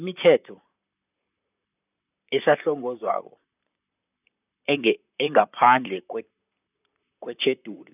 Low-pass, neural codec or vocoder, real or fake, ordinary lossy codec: 3.6 kHz; vocoder, 44.1 kHz, 128 mel bands, Pupu-Vocoder; fake; none